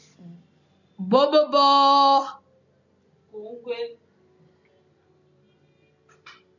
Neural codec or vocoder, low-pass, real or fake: none; 7.2 kHz; real